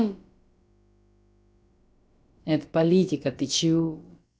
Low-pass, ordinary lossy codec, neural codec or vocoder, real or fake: none; none; codec, 16 kHz, about 1 kbps, DyCAST, with the encoder's durations; fake